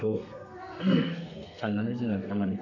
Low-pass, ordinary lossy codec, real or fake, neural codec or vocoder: 7.2 kHz; none; fake; codec, 32 kHz, 1.9 kbps, SNAC